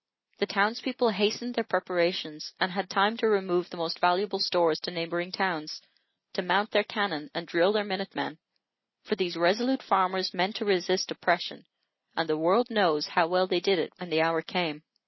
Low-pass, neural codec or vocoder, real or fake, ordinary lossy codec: 7.2 kHz; none; real; MP3, 24 kbps